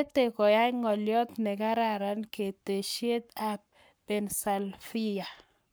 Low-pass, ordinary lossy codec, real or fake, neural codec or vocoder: none; none; fake; codec, 44.1 kHz, 7.8 kbps, Pupu-Codec